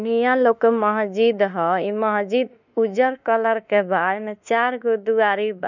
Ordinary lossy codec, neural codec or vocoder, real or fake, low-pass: none; autoencoder, 48 kHz, 32 numbers a frame, DAC-VAE, trained on Japanese speech; fake; 7.2 kHz